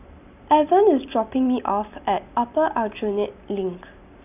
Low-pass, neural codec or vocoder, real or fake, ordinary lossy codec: 3.6 kHz; none; real; none